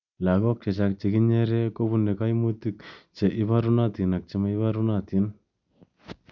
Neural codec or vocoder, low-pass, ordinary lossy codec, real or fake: none; 7.2 kHz; none; real